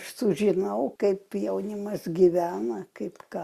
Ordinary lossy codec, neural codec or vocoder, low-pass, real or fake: Opus, 64 kbps; none; 14.4 kHz; real